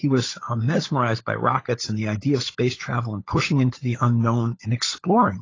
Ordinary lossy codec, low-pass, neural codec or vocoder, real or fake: AAC, 32 kbps; 7.2 kHz; codec, 16 kHz, 16 kbps, FunCodec, trained on LibriTTS, 50 frames a second; fake